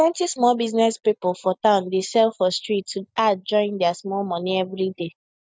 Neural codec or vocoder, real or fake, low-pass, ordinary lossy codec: none; real; none; none